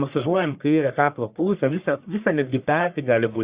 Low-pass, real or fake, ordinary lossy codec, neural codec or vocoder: 3.6 kHz; fake; Opus, 64 kbps; codec, 44.1 kHz, 1.7 kbps, Pupu-Codec